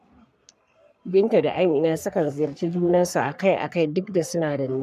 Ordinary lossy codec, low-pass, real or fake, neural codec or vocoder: none; 14.4 kHz; fake; codec, 44.1 kHz, 3.4 kbps, Pupu-Codec